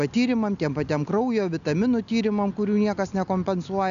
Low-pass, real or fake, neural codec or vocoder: 7.2 kHz; real; none